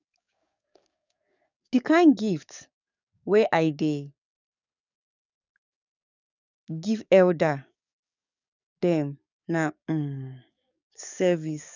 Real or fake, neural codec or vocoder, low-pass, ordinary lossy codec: fake; codec, 44.1 kHz, 7.8 kbps, DAC; 7.2 kHz; none